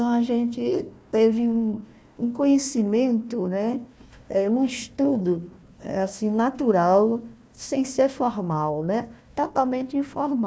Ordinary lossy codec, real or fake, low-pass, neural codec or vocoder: none; fake; none; codec, 16 kHz, 1 kbps, FunCodec, trained on Chinese and English, 50 frames a second